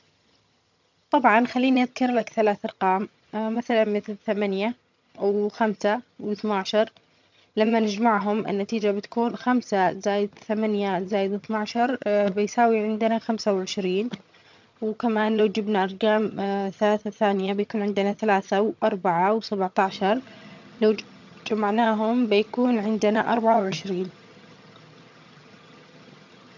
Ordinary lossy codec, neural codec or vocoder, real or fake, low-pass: MP3, 64 kbps; vocoder, 22.05 kHz, 80 mel bands, HiFi-GAN; fake; 7.2 kHz